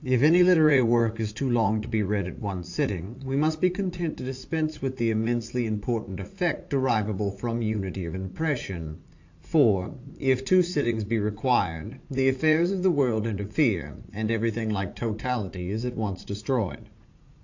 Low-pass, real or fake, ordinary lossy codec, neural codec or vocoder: 7.2 kHz; fake; AAC, 48 kbps; vocoder, 44.1 kHz, 80 mel bands, Vocos